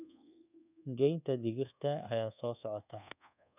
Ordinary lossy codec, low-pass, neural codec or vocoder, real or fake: none; 3.6 kHz; codec, 24 kHz, 1.2 kbps, DualCodec; fake